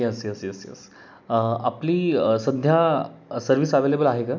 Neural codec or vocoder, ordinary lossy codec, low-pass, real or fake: none; none; none; real